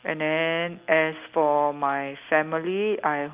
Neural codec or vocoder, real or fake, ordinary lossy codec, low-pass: none; real; Opus, 32 kbps; 3.6 kHz